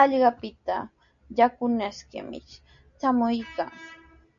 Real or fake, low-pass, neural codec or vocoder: real; 7.2 kHz; none